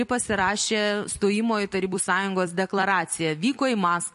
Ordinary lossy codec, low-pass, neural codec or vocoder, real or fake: MP3, 48 kbps; 14.4 kHz; vocoder, 44.1 kHz, 128 mel bands every 256 samples, BigVGAN v2; fake